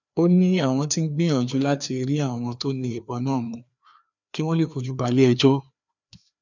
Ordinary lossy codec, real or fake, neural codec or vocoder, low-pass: none; fake; codec, 16 kHz, 2 kbps, FreqCodec, larger model; 7.2 kHz